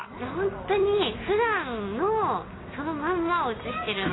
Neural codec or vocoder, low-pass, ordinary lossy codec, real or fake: none; 7.2 kHz; AAC, 16 kbps; real